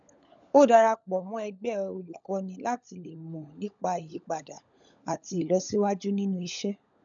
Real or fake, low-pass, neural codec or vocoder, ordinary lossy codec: fake; 7.2 kHz; codec, 16 kHz, 16 kbps, FunCodec, trained on LibriTTS, 50 frames a second; none